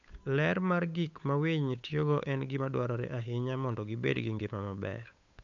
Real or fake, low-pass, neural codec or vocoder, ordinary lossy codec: fake; 7.2 kHz; codec, 16 kHz, 8 kbps, FunCodec, trained on Chinese and English, 25 frames a second; none